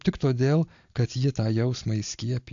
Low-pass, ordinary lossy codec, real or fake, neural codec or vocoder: 7.2 kHz; AAC, 64 kbps; real; none